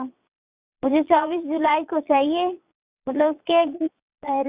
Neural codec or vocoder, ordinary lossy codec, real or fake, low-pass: vocoder, 22.05 kHz, 80 mel bands, Vocos; Opus, 64 kbps; fake; 3.6 kHz